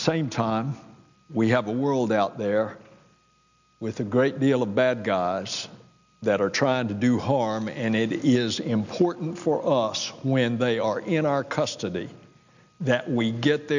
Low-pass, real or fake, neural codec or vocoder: 7.2 kHz; real; none